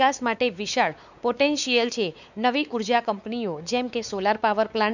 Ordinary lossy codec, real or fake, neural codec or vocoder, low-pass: none; fake; codec, 16 kHz, 4 kbps, X-Codec, WavLM features, trained on Multilingual LibriSpeech; 7.2 kHz